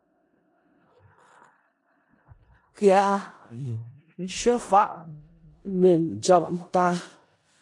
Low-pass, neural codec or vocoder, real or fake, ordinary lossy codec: 10.8 kHz; codec, 16 kHz in and 24 kHz out, 0.4 kbps, LongCat-Audio-Codec, four codebook decoder; fake; AAC, 48 kbps